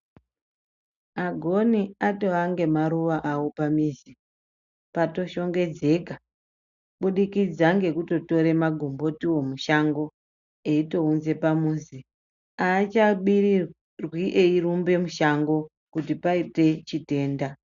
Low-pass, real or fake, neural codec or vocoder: 7.2 kHz; real; none